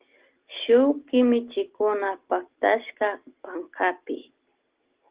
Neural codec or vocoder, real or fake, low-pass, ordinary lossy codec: none; real; 3.6 kHz; Opus, 16 kbps